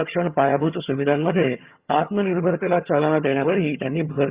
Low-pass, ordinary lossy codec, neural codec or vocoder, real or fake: 3.6 kHz; Opus, 64 kbps; vocoder, 22.05 kHz, 80 mel bands, HiFi-GAN; fake